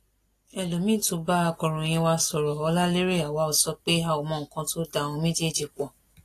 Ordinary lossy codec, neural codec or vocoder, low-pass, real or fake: AAC, 48 kbps; none; 14.4 kHz; real